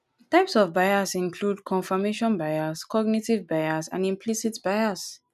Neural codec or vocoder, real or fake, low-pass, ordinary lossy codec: none; real; 14.4 kHz; none